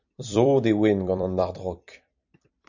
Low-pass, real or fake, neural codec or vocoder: 7.2 kHz; fake; vocoder, 44.1 kHz, 128 mel bands every 256 samples, BigVGAN v2